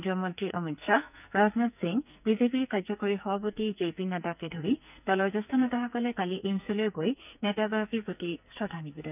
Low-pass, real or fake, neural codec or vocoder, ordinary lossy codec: 3.6 kHz; fake; codec, 44.1 kHz, 2.6 kbps, SNAC; none